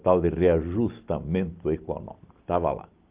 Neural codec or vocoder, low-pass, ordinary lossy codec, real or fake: none; 3.6 kHz; Opus, 32 kbps; real